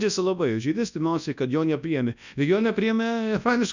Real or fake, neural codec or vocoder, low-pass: fake; codec, 24 kHz, 0.9 kbps, WavTokenizer, large speech release; 7.2 kHz